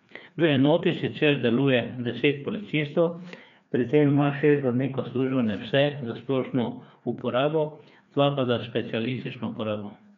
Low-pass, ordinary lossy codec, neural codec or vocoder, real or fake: 7.2 kHz; none; codec, 16 kHz, 2 kbps, FreqCodec, larger model; fake